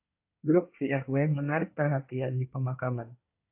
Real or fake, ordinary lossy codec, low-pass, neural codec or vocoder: fake; AAC, 32 kbps; 3.6 kHz; codec, 24 kHz, 1 kbps, SNAC